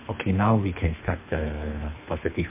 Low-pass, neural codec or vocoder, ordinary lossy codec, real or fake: 3.6 kHz; codec, 24 kHz, 3 kbps, HILCodec; MP3, 24 kbps; fake